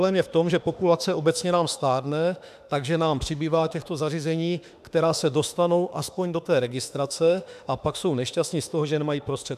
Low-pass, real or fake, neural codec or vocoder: 14.4 kHz; fake; autoencoder, 48 kHz, 32 numbers a frame, DAC-VAE, trained on Japanese speech